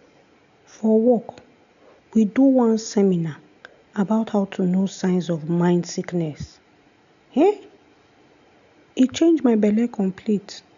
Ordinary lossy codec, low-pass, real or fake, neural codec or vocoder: none; 7.2 kHz; real; none